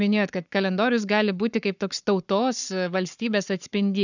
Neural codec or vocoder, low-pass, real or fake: codec, 44.1 kHz, 7.8 kbps, Pupu-Codec; 7.2 kHz; fake